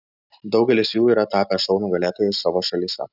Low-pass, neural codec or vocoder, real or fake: 5.4 kHz; none; real